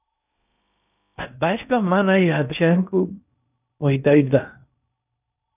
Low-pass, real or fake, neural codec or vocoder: 3.6 kHz; fake; codec, 16 kHz in and 24 kHz out, 0.8 kbps, FocalCodec, streaming, 65536 codes